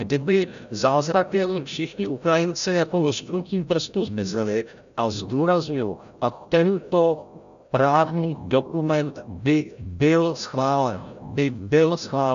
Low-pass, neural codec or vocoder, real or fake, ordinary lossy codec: 7.2 kHz; codec, 16 kHz, 0.5 kbps, FreqCodec, larger model; fake; AAC, 96 kbps